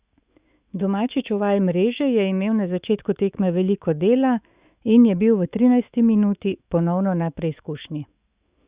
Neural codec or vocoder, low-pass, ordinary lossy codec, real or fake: autoencoder, 48 kHz, 128 numbers a frame, DAC-VAE, trained on Japanese speech; 3.6 kHz; Opus, 64 kbps; fake